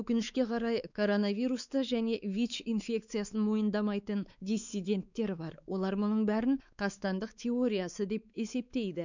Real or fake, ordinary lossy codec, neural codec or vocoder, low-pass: fake; none; codec, 16 kHz, 4 kbps, X-Codec, WavLM features, trained on Multilingual LibriSpeech; 7.2 kHz